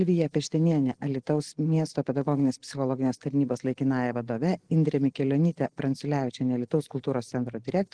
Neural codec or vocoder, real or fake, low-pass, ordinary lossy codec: none; real; 9.9 kHz; Opus, 16 kbps